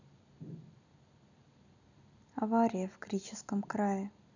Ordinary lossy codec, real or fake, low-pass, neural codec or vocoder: none; real; 7.2 kHz; none